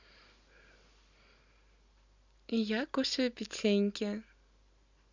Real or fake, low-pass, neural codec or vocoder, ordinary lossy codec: real; 7.2 kHz; none; Opus, 64 kbps